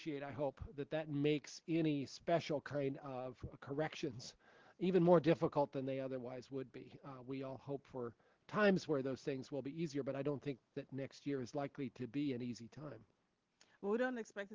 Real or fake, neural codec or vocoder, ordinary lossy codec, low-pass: real; none; Opus, 16 kbps; 7.2 kHz